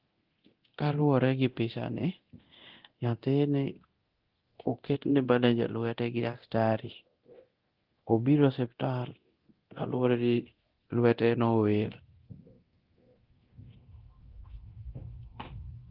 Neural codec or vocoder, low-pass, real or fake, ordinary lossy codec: codec, 24 kHz, 0.9 kbps, DualCodec; 5.4 kHz; fake; Opus, 16 kbps